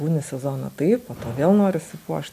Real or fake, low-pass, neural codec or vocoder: real; 14.4 kHz; none